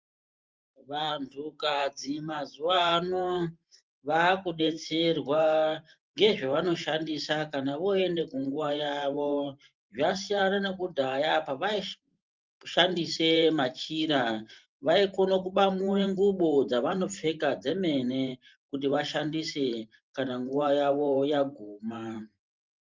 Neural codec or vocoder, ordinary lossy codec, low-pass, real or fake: vocoder, 44.1 kHz, 128 mel bands every 512 samples, BigVGAN v2; Opus, 24 kbps; 7.2 kHz; fake